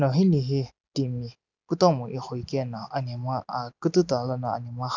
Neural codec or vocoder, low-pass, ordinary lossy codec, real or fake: none; 7.2 kHz; none; real